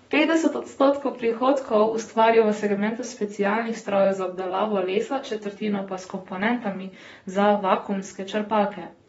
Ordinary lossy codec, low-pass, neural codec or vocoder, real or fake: AAC, 24 kbps; 19.8 kHz; vocoder, 44.1 kHz, 128 mel bands, Pupu-Vocoder; fake